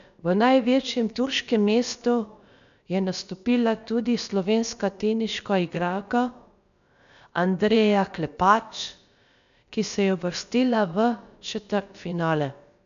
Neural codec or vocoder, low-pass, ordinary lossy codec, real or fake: codec, 16 kHz, about 1 kbps, DyCAST, with the encoder's durations; 7.2 kHz; none; fake